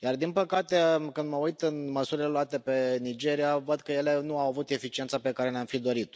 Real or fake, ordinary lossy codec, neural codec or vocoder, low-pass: real; none; none; none